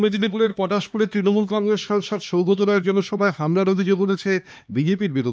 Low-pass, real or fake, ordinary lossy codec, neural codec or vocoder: none; fake; none; codec, 16 kHz, 2 kbps, X-Codec, HuBERT features, trained on LibriSpeech